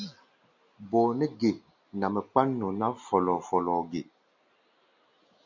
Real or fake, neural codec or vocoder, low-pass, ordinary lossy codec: real; none; 7.2 kHz; MP3, 48 kbps